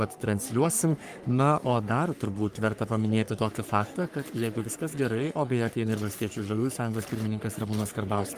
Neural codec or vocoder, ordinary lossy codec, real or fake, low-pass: codec, 44.1 kHz, 3.4 kbps, Pupu-Codec; Opus, 32 kbps; fake; 14.4 kHz